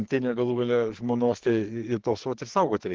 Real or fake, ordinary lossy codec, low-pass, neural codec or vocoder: fake; Opus, 16 kbps; 7.2 kHz; codec, 44.1 kHz, 2.6 kbps, SNAC